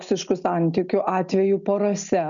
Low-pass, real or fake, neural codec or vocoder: 7.2 kHz; real; none